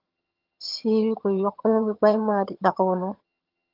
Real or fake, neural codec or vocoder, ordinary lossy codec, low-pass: fake; vocoder, 22.05 kHz, 80 mel bands, HiFi-GAN; Opus, 32 kbps; 5.4 kHz